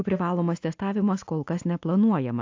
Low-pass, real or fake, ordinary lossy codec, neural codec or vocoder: 7.2 kHz; real; AAC, 48 kbps; none